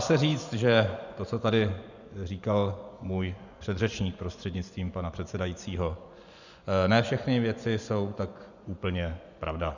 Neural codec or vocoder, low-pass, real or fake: vocoder, 24 kHz, 100 mel bands, Vocos; 7.2 kHz; fake